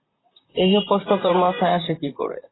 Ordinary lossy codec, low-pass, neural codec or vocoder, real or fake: AAC, 16 kbps; 7.2 kHz; vocoder, 44.1 kHz, 128 mel bands, Pupu-Vocoder; fake